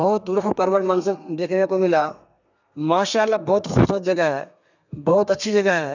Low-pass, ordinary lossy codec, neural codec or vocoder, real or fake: 7.2 kHz; none; codec, 32 kHz, 1.9 kbps, SNAC; fake